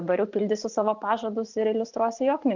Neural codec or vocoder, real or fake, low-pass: none; real; 7.2 kHz